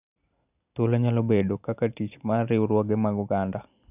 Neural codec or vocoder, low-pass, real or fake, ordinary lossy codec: none; 3.6 kHz; real; none